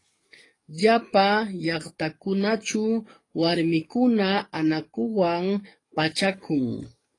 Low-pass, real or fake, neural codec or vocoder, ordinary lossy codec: 10.8 kHz; fake; codec, 44.1 kHz, 7.8 kbps, DAC; AAC, 32 kbps